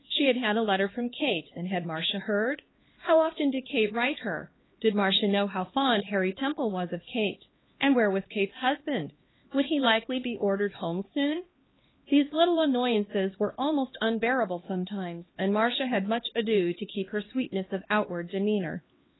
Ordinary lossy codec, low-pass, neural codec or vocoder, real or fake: AAC, 16 kbps; 7.2 kHz; codec, 16 kHz, 2 kbps, X-Codec, HuBERT features, trained on LibriSpeech; fake